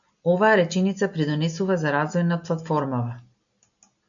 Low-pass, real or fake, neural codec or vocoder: 7.2 kHz; real; none